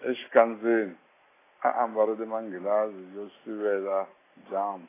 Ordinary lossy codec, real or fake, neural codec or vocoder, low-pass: AAC, 16 kbps; real; none; 3.6 kHz